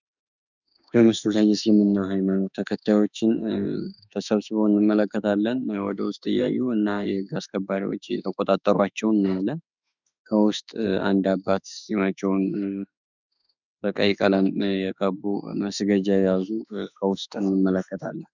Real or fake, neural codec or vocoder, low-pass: fake; autoencoder, 48 kHz, 32 numbers a frame, DAC-VAE, trained on Japanese speech; 7.2 kHz